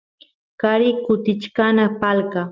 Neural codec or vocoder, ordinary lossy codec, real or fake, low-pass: none; Opus, 32 kbps; real; 7.2 kHz